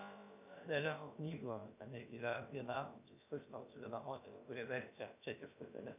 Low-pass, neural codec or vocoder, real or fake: 3.6 kHz; codec, 16 kHz, about 1 kbps, DyCAST, with the encoder's durations; fake